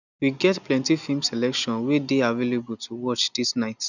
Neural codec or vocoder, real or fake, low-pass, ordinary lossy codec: none; real; 7.2 kHz; none